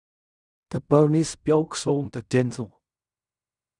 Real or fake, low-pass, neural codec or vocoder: fake; 10.8 kHz; codec, 16 kHz in and 24 kHz out, 0.4 kbps, LongCat-Audio-Codec, fine tuned four codebook decoder